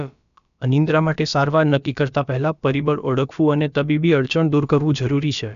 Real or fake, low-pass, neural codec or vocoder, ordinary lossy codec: fake; 7.2 kHz; codec, 16 kHz, about 1 kbps, DyCAST, with the encoder's durations; none